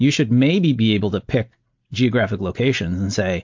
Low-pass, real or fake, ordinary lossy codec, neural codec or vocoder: 7.2 kHz; real; MP3, 48 kbps; none